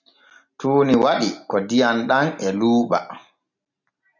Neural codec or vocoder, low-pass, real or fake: none; 7.2 kHz; real